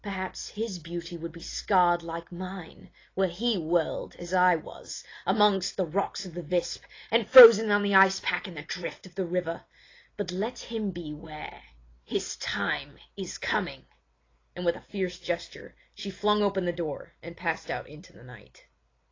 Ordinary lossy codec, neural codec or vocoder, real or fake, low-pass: AAC, 32 kbps; none; real; 7.2 kHz